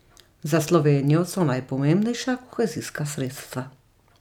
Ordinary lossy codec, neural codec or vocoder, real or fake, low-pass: none; none; real; 19.8 kHz